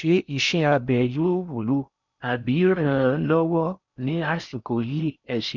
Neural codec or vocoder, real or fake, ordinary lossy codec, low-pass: codec, 16 kHz in and 24 kHz out, 0.6 kbps, FocalCodec, streaming, 4096 codes; fake; none; 7.2 kHz